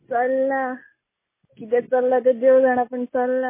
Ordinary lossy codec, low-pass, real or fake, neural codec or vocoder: MP3, 16 kbps; 3.6 kHz; real; none